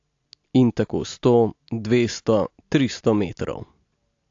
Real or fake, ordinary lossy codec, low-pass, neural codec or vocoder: real; AAC, 48 kbps; 7.2 kHz; none